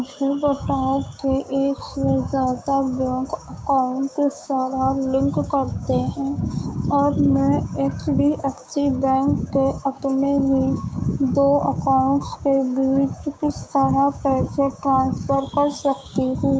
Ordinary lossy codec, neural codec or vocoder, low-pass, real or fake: none; codec, 16 kHz, 6 kbps, DAC; none; fake